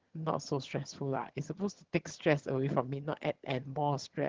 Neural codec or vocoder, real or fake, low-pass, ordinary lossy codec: vocoder, 22.05 kHz, 80 mel bands, HiFi-GAN; fake; 7.2 kHz; Opus, 16 kbps